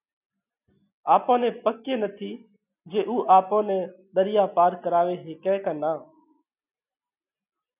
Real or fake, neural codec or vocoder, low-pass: real; none; 3.6 kHz